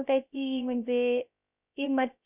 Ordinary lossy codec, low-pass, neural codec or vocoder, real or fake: none; 3.6 kHz; codec, 16 kHz, 0.3 kbps, FocalCodec; fake